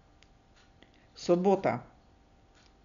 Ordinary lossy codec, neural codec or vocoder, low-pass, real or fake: none; none; 7.2 kHz; real